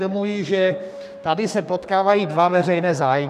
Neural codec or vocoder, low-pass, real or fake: codec, 32 kHz, 1.9 kbps, SNAC; 14.4 kHz; fake